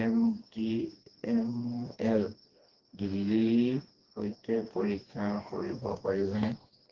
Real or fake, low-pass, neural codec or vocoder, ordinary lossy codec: fake; 7.2 kHz; codec, 16 kHz, 2 kbps, FreqCodec, smaller model; Opus, 16 kbps